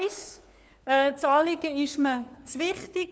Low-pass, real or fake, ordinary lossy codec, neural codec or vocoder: none; fake; none; codec, 16 kHz, 4 kbps, FunCodec, trained on LibriTTS, 50 frames a second